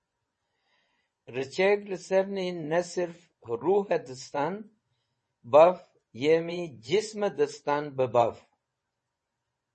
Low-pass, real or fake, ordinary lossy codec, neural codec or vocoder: 10.8 kHz; fake; MP3, 32 kbps; vocoder, 24 kHz, 100 mel bands, Vocos